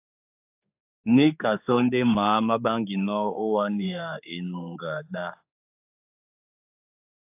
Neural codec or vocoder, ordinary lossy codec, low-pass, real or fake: codec, 16 kHz, 4 kbps, X-Codec, HuBERT features, trained on general audio; AAC, 32 kbps; 3.6 kHz; fake